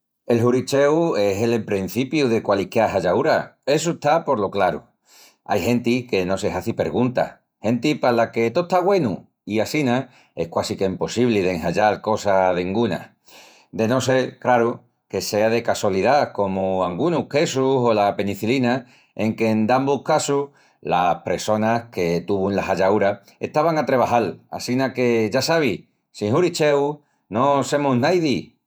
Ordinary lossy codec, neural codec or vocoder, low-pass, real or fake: none; vocoder, 48 kHz, 128 mel bands, Vocos; none; fake